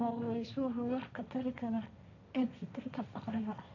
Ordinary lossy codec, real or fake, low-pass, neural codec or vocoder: none; fake; 7.2 kHz; codec, 16 kHz, 1.1 kbps, Voila-Tokenizer